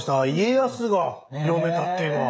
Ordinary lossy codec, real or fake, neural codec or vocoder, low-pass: none; fake; codec, 16 kHz, 16 kbps, FreqCodec, smaller model; none